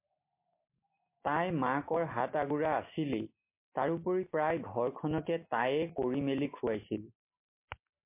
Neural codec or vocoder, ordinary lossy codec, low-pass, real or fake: none; MP3, 24 kbps; 3.6 kHz; real